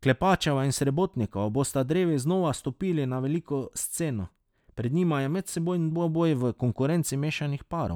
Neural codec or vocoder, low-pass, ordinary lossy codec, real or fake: none; 19.8 kHz; none; real